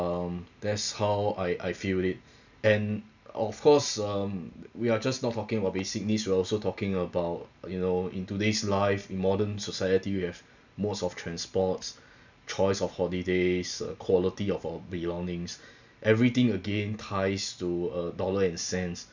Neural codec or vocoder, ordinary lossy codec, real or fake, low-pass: none; none; real; 7.2 kHz